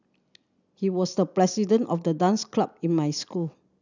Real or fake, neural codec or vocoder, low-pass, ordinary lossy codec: real; none; 7.2 kHz; none